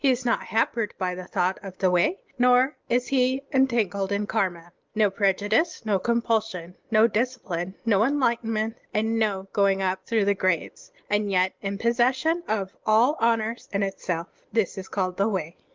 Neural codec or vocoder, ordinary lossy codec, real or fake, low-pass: none; Opus, 24 kbps; real; 7.2 kHz